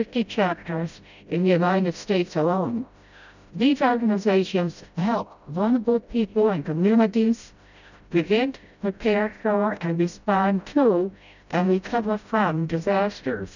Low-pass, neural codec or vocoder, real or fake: 7.2 kHz; codec, 16 kHz, 0.5 kbps, FreqCodec, smaller model; fake